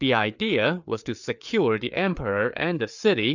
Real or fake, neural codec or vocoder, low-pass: fake; codec, 16 kHz, 4 kbps, FreqCodec, larger model; 7.2 kHz